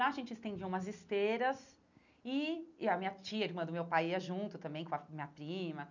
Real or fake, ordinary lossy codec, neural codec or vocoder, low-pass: real; none; none; 7.2 kHz